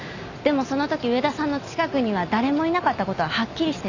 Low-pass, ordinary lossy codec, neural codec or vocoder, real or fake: 7.2 kHz; none; none; real